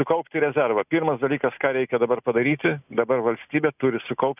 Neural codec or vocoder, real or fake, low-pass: none; real; 3.6 kHz